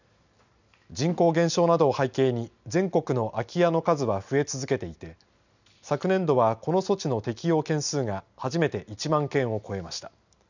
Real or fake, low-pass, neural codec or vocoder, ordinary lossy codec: real; 7.2 kHz; none; none